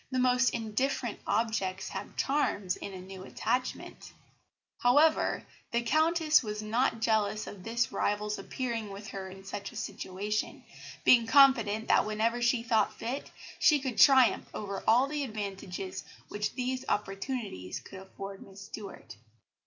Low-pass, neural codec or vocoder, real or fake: 7.2 kHz; none; real